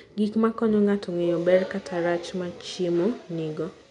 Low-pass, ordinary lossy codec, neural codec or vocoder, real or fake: 10.8 kHz; none; none; real